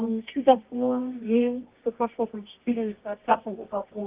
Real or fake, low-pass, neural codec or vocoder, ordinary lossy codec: fake; 3.6 kHz; codec, 24 kHz, 0.9 kbps, WavTokenizer, medium music audio release; Opus, 24 kbps